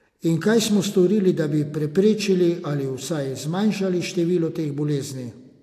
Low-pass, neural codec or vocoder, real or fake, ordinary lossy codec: 14.4 kHz; none; real; AAC, 64 kbps